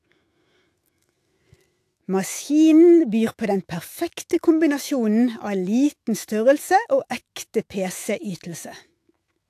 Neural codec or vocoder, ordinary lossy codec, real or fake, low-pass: autoencoder, 48 kHz, 128 numbers a frame, DAC-VAE, trained on Japanese speech; AAC, 64 kbps; fake; 14.4 kHz